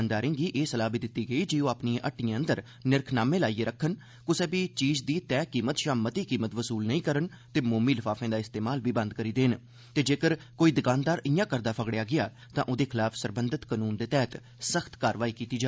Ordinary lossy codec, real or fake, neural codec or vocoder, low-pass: none; real; none; none